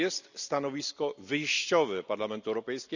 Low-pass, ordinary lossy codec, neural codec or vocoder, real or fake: 7.2 kHz; none; none; real